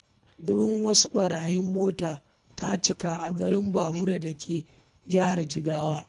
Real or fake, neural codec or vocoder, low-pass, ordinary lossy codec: fake; codec, 24 kHz, 1.5 kbps, HILCodec; 10.8 kHz; AAC, 96 kbps